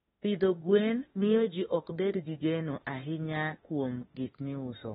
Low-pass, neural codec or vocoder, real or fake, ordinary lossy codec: 19.8 kHz; autoencoder, 48 kHz, 32 numbers a frame, DAC-VAE, trained on Japanese speech; fake; AAC, 16 kbps